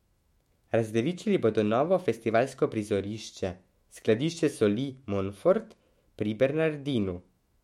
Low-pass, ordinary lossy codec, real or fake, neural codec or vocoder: 19.8 kHz; MP3, 64 kbps; fake; autoencoder, 48 kHz, 128 numbers a frame, DAC-VAE, trained on Japanese speech